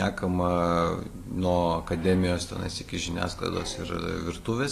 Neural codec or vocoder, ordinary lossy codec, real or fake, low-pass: none; AAC, 48 kbps; real; 14.4 kHz